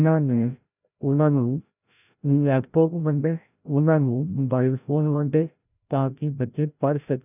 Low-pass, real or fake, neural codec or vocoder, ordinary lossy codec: 3.6 kHz; fake; codec, 16 kHz, 0.5 kbps, FreqCodec, larger model; none